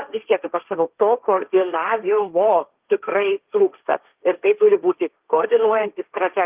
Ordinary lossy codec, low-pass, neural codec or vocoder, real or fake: Opus, 32 kbps; 3.6 kHz; codec, 16 kHz, 1.1 kbps, Voila-Tokenizer; fake